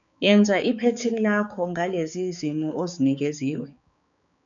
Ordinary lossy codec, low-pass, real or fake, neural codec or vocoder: MP3, 96 kbps; 7.2 kHz; fake; codec, 16 kHz, 4 kbps, X-Codec, HuBERT features, trained on balanced general audio